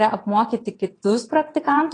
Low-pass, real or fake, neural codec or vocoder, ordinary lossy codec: 9.9 kHz; fake; vocoder, 22.05 kHz, 80 mel bands, WaveNeXt; AAC, 32 kbps